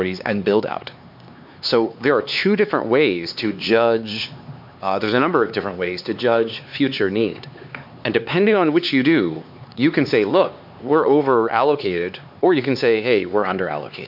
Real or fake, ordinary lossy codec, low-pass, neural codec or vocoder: fake; MP3, 48 kbps; 5.4 kHz; codec, 16 kHz, 4 kbps, X-Codec, HuBERT features, trained on LibriSpeech